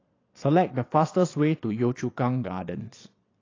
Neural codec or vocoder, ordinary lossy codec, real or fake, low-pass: vocoder, 22.05 kHz, 80 mel bands, Vocos; AAC, 32 kbps; fake; 7.2 kHz